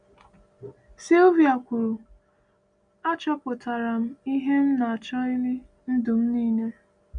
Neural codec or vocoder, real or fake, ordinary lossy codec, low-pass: none; real; none; 9.9 kHz